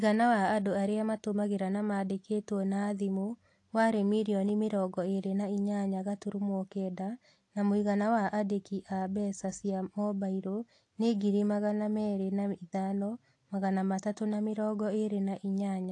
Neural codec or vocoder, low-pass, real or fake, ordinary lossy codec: none; 10.8 kHz; real; AAC, 48 kbps